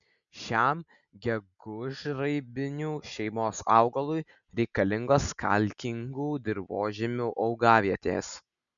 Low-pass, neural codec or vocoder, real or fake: 7.2 kHz; none; real